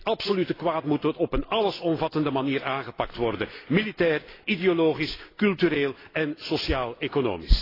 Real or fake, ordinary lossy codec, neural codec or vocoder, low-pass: real; AAC, 24 kbps; none; 5.4 kHz